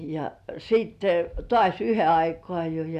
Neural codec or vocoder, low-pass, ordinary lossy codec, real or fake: none; 14.4 kHz; none; real